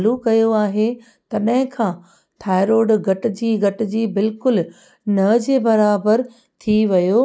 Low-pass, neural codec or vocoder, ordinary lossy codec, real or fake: none; none; none; real